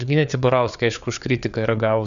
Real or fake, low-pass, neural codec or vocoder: fake; 7.2 kHz; codec, 16 kHz, 4 kbps, FreqCodec, larger model